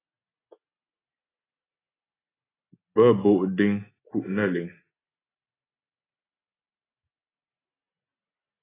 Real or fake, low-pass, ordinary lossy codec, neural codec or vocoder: real; 3.6 kHz; AAC, 16 kbps; none